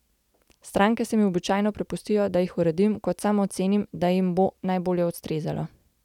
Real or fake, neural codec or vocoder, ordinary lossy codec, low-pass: real; none; none; 19.8 kHz